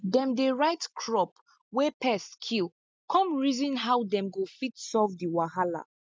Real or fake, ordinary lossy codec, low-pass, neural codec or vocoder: real; none; none; none